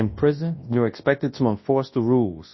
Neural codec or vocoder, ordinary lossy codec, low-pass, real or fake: codec, 24 kHz, 0.9 kbps, WavTokenizer, large speech release; MP3, 24 kbps; 7.2 kHz; fake